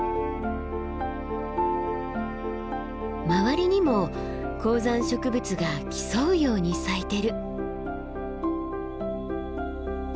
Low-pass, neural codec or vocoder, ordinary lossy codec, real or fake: none; none; none; real